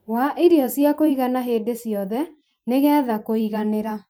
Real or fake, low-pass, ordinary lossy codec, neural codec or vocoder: fake; none; none; vocoder, 44.1 kHz, 128 mel bands every 512 samples, BigVGAN v2